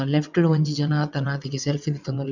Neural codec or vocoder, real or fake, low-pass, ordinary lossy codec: vocoder, 22.05 kHz, 80 mel bands, WaveNeXt; fake; 7.2 kHz; none